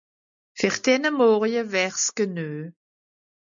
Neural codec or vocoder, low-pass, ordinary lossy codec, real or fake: none; 7.2 kHz; MP3, 64 kbps; real